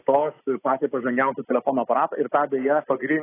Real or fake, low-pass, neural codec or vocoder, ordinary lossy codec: real; 3.6 kHz; none; AAC, 16 kbps